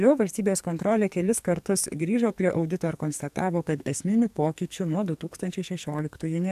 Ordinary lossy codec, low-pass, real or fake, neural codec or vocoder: AAC, 96 kbps; 14.4 kHz; fake; codec, 44.1 kHz, 2.6 kbps, SNAC